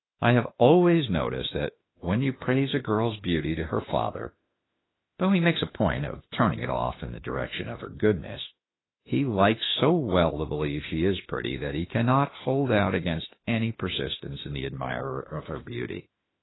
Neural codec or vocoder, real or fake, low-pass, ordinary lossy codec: autoencoder, 48 kHz, 32 numbers a frame, DAC-VAE, trained on Japanese speech; fake; 7.2 kHz; AAC, 16 kbps